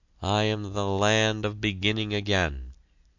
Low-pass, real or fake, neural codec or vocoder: 7.2 kHz; real; none